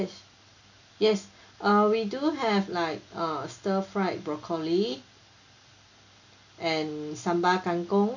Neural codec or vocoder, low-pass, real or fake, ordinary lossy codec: none; 7.2 kHz; real; none